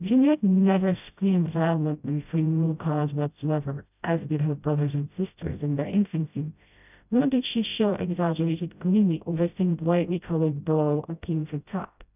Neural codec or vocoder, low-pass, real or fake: codec, 16 kHz, 0.5 kbps, FreqCodec, smaller model; 3.6 kHz; fake